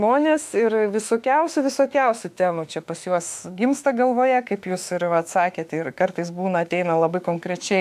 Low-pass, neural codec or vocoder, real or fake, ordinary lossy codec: 14.4 kHz; autoencoder, 48 kHz, 32 numbers a frame, DAC-VAE, trained on Japanese speech; fake; AAC, 96 kbps